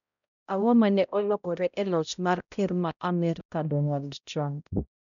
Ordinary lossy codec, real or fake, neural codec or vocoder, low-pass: none; fake; codec, 16 kHz, 0.5 kbps, X-Codec, HuBERT features, trained on balanced general audio; 7.2 kHz